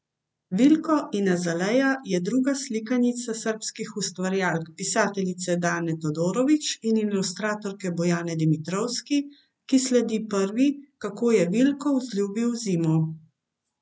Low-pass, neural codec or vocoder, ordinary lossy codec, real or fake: none; none; none; real